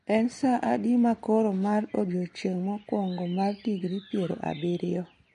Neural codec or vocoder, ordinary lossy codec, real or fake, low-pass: none; MP3, 48 kbps; real; 14.4 kHz